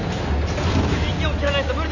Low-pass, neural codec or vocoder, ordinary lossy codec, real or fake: 7.2 kHz; none; AAC, 48 kbps; real